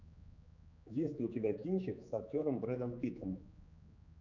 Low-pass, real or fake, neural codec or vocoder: 7.2 kHz; fake; codec, 16 kHz, 4 kbps, X-Codec, HuBERT features, trained on general audio